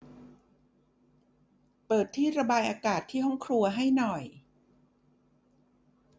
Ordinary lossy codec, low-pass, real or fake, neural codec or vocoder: none; none; real; none